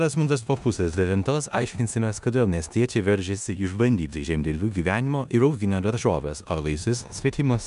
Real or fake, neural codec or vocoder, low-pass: fake; codec, 16 kHz in and 24 kHz out, 0.9 kbps, LongCat-Audio-Codec, four codebook decoder; 10.8 kHz